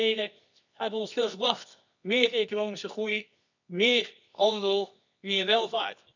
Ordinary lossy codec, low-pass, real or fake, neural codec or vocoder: none; 7.2 kHz; fake; codec, 24 kHz, 0.9 kbps, WavTokenizer, medium music audio release